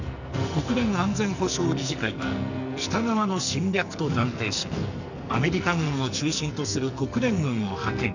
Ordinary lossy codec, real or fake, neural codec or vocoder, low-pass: none; fake; codec, 44.1 kHz, 2.6 kbps, SNAC; 7.2 kHz